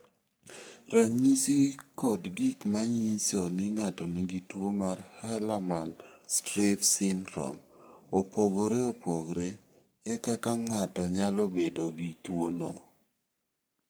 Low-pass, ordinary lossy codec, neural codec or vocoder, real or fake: none; none; codec, 44.1 kHz, 3.4 kbps, Pupu-Codec; fake